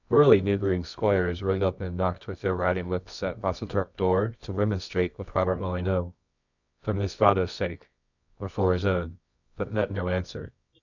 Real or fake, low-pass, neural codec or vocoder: fake; 7.2 kHz; codec, 24 kHz, 0.9 kbps, WavTokenizer, medium music audio release